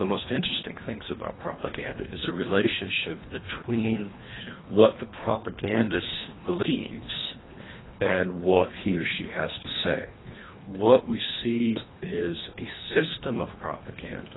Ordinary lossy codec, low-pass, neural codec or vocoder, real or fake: AAC, 16 kbps; 7.2 kHz; codec, 24 kHz, 1.5 kbps, HILCodec; fake